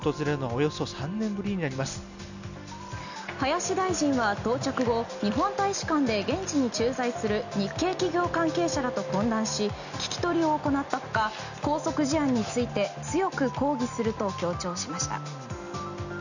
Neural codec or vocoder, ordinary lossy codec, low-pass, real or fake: none; none; 7.2 kHz; real